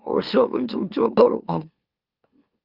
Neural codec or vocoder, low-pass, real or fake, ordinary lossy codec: autoencoder, 44.1 kHz, a latent of 192 numbers a frame, MeloTTS; 5.4 kHz; fake; Opus, 32 kbps